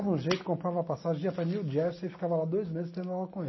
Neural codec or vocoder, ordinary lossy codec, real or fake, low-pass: none; MP3, 24 kbps; real; 7.2 kHz